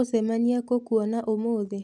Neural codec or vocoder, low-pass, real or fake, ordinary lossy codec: none; none; real; none